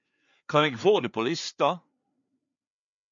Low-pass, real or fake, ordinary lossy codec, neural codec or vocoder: 7.2 kHz; fake; MP3, 64 kbps; codec, 16 kHz, 4 kbps, FreqCodec, larger model